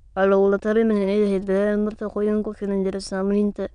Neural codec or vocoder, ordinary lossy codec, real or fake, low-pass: autoencoder, 22.05 kHz, a latent of 192 numbers a frame, VITS, trained on many speakers; none; fake; 9.9 kHz